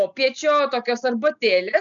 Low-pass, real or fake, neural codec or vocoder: 7.2 kHz; real; none